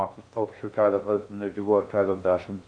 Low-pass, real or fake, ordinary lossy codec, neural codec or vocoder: 9.9 kHz; fake; Opus, 64 kbps; codec, 16 kHz in and 24 kHz out, 0.6 kbps, FocalCodec, streaming, 2048 codes